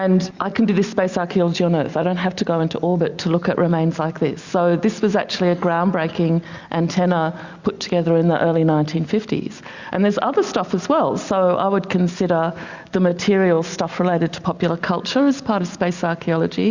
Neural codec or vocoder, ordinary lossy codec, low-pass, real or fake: none; Opus, 64 kbps; 7.2 kHz; real